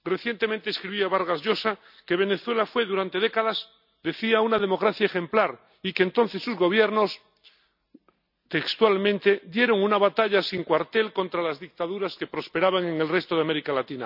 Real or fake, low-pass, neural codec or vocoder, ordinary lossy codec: real; 5.4 kHz; none; none